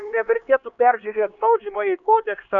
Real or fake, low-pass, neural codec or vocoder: fake; 7.2 kHz; codec, 16 kHz, 2 kbps, X-Codec, HuBERT features, trained on LibriSpeech